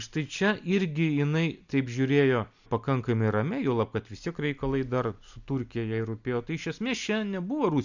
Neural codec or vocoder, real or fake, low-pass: none; real; 7.2 kHz